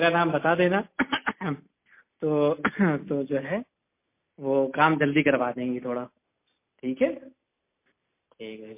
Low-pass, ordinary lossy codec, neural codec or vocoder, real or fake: 3.6 kHz; MP3, 24 kbps; none; real